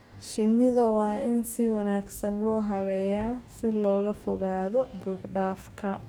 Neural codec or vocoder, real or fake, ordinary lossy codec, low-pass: codec, 44.1 kHz, 2.6 kbps, DAC; fake; none; none